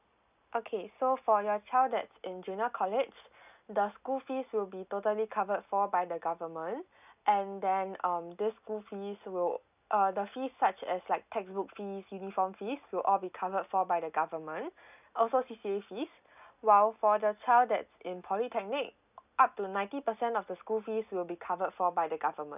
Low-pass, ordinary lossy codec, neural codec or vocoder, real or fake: 3.6 kHz; none; none; real